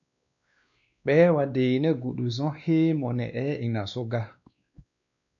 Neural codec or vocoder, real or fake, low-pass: codec, 16 kHz, 2 kbps, X-Codec, WavLM features, trained on Multilingual LibriSpeech; fake; 7.2 kHz